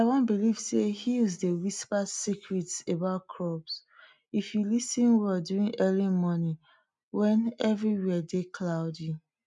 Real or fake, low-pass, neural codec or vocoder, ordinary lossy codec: real; 10.8 kHz; none; none